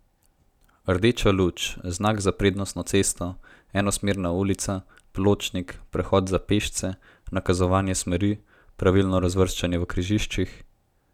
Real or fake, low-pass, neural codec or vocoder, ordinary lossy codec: real; 19.8 kHz; none; none